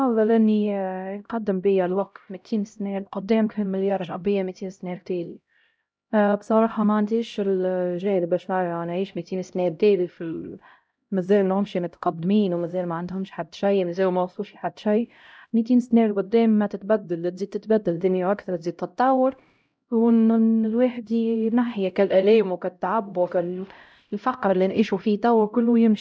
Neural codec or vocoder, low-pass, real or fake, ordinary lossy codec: codec, 16 kHz, 0.5 kbps, X-Codec, HuBERT features, trained on LibriSpeech; none; fake; none